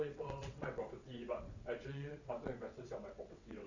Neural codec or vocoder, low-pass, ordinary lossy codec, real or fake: vocoder, 22.05 kHz, 80 mel bands, WaveNeXt; 7.2 kHz; none; fake